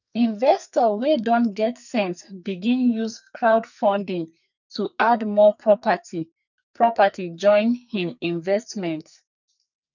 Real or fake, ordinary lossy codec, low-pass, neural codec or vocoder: fake; none; 7.2 kHz; codec, 44.1 kHz, 2.6 kbps, SNAC